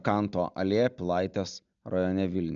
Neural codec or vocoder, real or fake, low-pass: none; real; 7.2 kHz